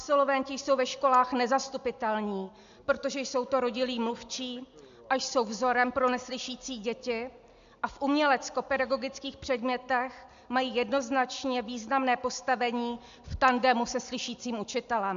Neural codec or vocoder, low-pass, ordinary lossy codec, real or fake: none; 7.2 kHz; MP3, 64 kbps; real